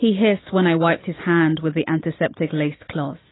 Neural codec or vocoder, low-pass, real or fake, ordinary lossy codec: none; 7.2 kHz; real; AAC, 16 kbps